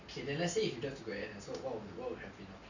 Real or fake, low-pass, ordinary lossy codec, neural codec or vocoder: real; 7.2 kHz; none; none